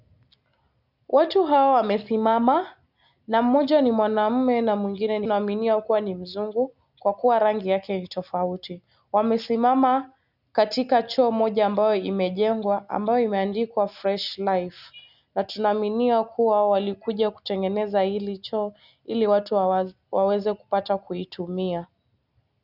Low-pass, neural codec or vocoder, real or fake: 5.4 kHz; none; real